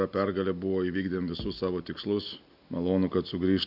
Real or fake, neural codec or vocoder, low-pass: real; none; 5.4 kHz